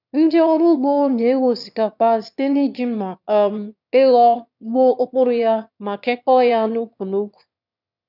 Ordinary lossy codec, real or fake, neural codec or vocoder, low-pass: none; fake; autoencoder, 22.05 kHz, a latent of 192 numbers a frame, VITS, trained on one speaker; 5.4 kHz